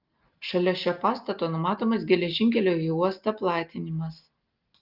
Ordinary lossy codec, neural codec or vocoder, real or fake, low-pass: Opus, 24 kbps; none; real; 5.4 kHz